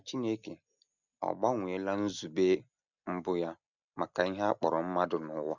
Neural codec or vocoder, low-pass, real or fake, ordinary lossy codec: none; 7.2 kHz; real; none